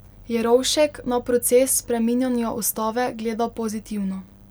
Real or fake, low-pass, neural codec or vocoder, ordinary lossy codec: real; none; none; none